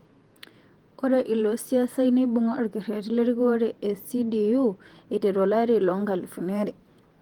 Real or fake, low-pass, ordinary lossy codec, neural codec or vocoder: fake; 19.8 kHz; Opus, 24 kbps; vocoder, 48 kHz, 128 mel bands, Vocos